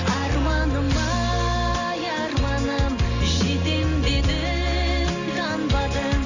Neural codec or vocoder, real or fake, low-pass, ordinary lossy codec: none; real; 7.2 kHz; none